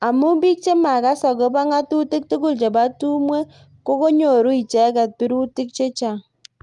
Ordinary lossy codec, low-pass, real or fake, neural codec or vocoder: Opus, 32 kbps; 10.8 kHz; real; none